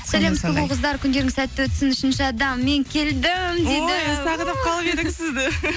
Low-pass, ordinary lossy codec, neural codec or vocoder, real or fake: none; none; none; real